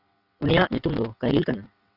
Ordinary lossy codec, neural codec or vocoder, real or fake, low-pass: Opus, 64 kbps; vocoder, 22.05 kHz, 80 mel bands, WaveNeXt; fake; 5.4 kHz